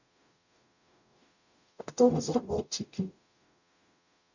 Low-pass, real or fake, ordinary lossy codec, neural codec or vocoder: 7.2 kHz; fake; none; codec, 44.1 kHz, 0.9 kbps, DAC